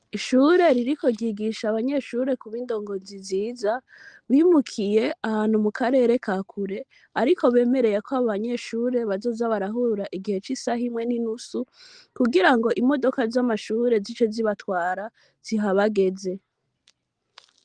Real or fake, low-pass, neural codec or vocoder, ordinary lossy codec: real; 9.9 kHz; none; Opus, 24 kbps